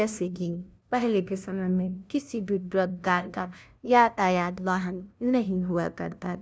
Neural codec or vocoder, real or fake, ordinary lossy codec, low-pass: codec, 16 kHz, 0.5 kbps, FunCodec, trained on LibriTTS, 25 frames a second; fake; none; none